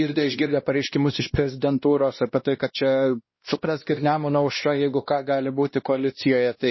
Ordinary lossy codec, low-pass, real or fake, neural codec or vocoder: MP3, 24 kbps; 7.2 kHz; fake; codec, 16 kHz, 1 kbps, X-Codec, WavLM features, trained on Multilingual LibriSpeech